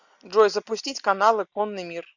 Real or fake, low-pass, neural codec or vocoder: real; 7.2 kHz; none